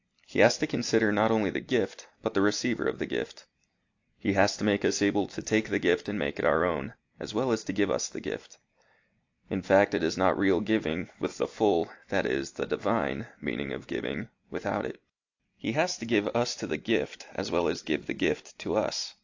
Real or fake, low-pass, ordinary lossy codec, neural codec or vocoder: real; 7.2 kHz; AAC, 48 kbps; none